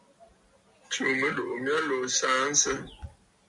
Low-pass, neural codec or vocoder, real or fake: 10.8 kHz; none; real